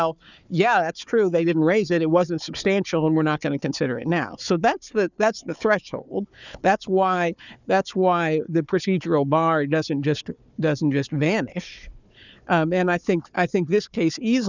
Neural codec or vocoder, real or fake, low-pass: codec, 16 kHz, 4 kbps, FunCodec, trained on Chinese and English, 50 frames a second; fake; 7.2 kHz